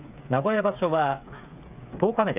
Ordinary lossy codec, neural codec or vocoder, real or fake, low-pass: none; codec, 16 kHz, 8 kbps, FreqCodec, smaller model; fake; 3.6 kHz